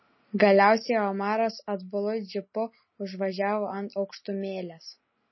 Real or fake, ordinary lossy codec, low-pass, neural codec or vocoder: real; MP3, 24 kbps; 7.2 kHz; none